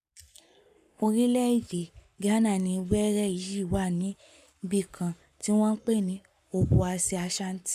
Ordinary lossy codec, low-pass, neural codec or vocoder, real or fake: none; 14.4 kHz; codec, 44.1 kHz, 7.8 kbps, Pupu-Codec; fake